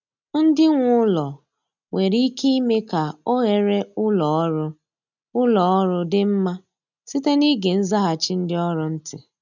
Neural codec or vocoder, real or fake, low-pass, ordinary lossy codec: none; real; 7.2 kHz; none